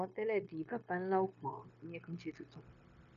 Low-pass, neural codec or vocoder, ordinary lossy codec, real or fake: 7.2 kHz; codec, 16 kHz, 0.9 kbps, LongCat-Audio-Codec; none; fake